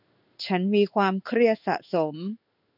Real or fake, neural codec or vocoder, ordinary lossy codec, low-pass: fake; codec, 16 kHz in and 24 kHz out, 1 kbps, XY-Tokenizer; none; 5.4 kHz